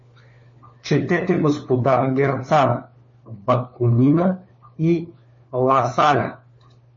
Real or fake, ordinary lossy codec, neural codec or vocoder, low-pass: fake; MP3, 32 kbps; codec, 16 kHz, 4 kbps, FunCodec, trained on LibriTTS, 50 frames a second; 7.2 kHz